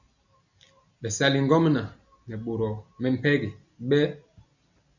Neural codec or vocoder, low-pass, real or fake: none; 7.2 kHz; real